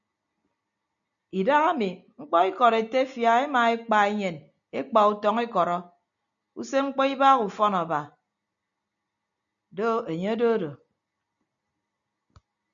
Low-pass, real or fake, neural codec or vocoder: 7.2 kHz; real; none